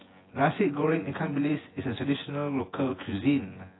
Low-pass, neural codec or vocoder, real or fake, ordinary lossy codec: 7.2 kHz; vocoder, 24 kHz, 100 mel bands, Vocos; fake; AAC, 16 kbps